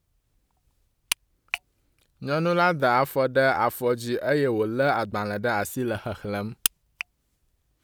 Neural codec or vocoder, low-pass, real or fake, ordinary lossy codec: none; none; real; none